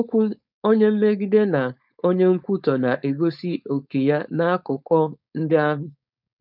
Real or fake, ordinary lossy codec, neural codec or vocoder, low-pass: fake; none; codec, 16 kHz, 4.8 kbps, FACodec; 5.4 kHz